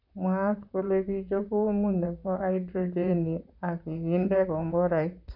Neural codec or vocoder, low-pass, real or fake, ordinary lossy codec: vocoder, 44.1 kHz, 128 mel bands, Pupu-Vocoder; 5.4 kHz; fake; none